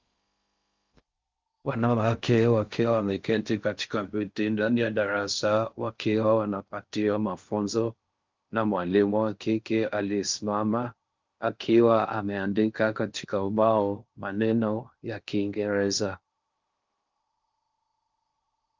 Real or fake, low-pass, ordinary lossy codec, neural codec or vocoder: fake; 7.2 kHz; Opus, 24 kbps; codec, 16 kHz in and 24 kHz out, 0.6 kbps, FocalCodec, streaming, 4096 codes